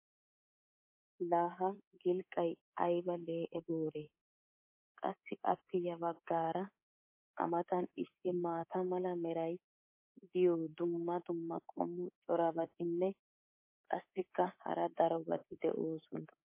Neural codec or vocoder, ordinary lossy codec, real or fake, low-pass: codec, 24 kHz, 3.1 kbps, DualCodec; MP3, 24 kbps; fake; 3.6 kHz